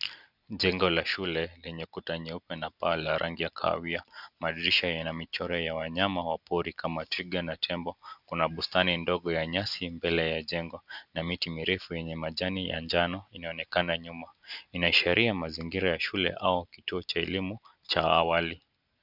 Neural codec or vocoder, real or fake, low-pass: none; real; 5.4 kHz